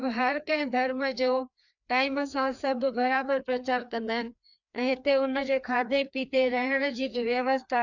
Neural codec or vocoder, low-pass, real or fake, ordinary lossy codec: codec, 16 kHz, 2 kbps, FreqCodec, larger model; 7.2 kHz; fake; none